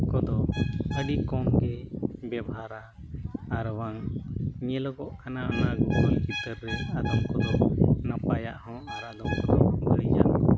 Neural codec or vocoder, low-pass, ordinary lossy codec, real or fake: none; none; none; real